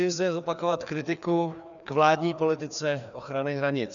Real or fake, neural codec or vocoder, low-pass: fake; codec, 16 kHz, 2 kbps, FreqCodec, larger model; 7.2 kHz